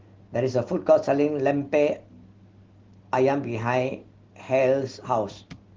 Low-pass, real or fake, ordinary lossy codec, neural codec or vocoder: 7.2 kHz; real; Opus, 16 kbps; none